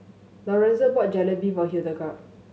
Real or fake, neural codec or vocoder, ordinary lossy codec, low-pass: real; none; none; none